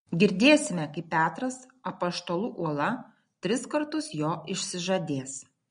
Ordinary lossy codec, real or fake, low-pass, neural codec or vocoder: MP3, 48 kbps; real; 9.9 kHz; none